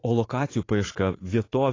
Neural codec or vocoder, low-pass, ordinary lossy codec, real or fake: vocoder, 44.1 kHz, 80 mel bands, Vocos; 7.2 kHz; AAC, 32 kbps; fake